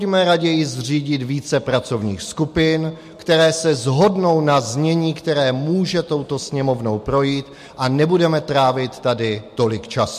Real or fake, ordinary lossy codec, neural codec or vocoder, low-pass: real; MP3, 64 kbps; none; 14.4 kHz